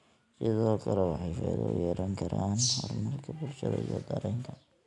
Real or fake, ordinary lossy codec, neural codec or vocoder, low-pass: real; MP3, 96 kbps; none; 10.8 kHz